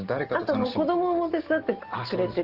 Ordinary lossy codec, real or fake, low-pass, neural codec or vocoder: Opus, 16 kbps; real; 5.4 kHz; none